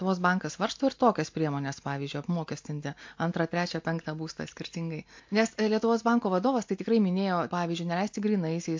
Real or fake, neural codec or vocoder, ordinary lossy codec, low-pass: real; none; MP3, 48 kbps; 7.2 kHz